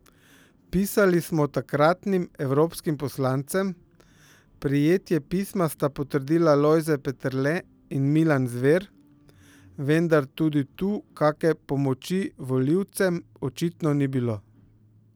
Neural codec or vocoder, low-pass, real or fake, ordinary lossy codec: none; none; real; none